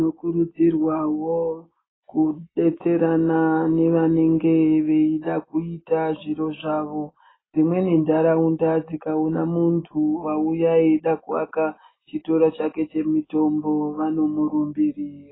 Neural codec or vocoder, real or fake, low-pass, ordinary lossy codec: none; real; 7.2 kHz; AAC, 16 kbps